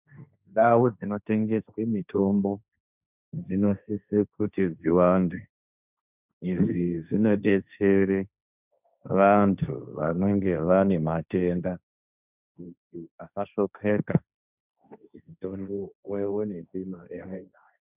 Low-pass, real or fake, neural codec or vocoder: 3.6 kHz; fake; codec, 16 kHz, 1.1 kbps, Voila-Tokenizer